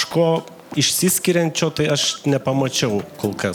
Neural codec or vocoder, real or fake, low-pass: none; real; 19.8 kHz